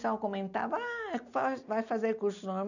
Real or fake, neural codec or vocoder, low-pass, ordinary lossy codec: real; none; 7.2 kHz; none